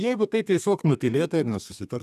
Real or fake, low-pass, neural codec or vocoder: fake; 14.4 kHz; codec, 32 kHz, 1.9 kbps, SNAC